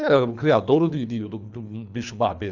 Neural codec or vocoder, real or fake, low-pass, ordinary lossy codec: codec, 24 kHz, 3 kbps, HILCodec; fake; 7.2 kHz; none